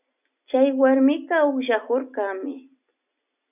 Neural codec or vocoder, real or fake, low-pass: none; real; 3.6 kHz